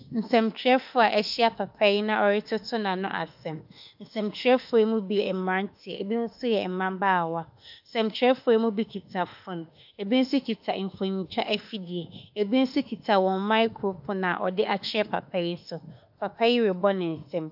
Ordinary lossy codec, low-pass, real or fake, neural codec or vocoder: AAC, 48 kbps; 5.4 kHz; fake; autoencoder, 48 kHz, 32 numbers a frame, DAC-VAE, trained on Japanese speech